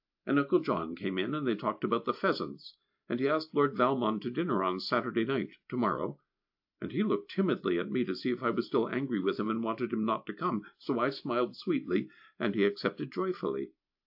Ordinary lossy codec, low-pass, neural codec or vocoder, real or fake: AAC, 48 kbps; 5.4 kHz; none; real